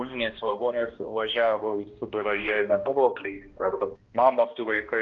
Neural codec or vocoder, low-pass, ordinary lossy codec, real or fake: codec, 16 kHz, 1 kbps, X-Codec, HuBERT features, trained on balanced general audio; 7.2 kHz; Opus, 24 kbps; fake